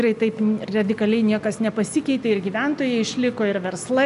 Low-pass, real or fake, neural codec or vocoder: 10.8 kHz; real; none